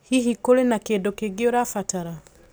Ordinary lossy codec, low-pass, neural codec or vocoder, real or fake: none; none; none; real